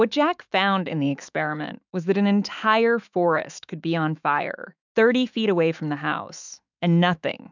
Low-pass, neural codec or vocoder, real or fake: 7.2 kHz; autoencoder, 48 kHz, 128 numbers a frame, DAC-VAE, trained on Japanese speech; fake